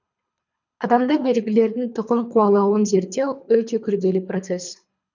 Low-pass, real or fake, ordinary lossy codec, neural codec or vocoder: 7.2 kHz; fake; none; codec, 24 kHz, 3 kbps, HILCodec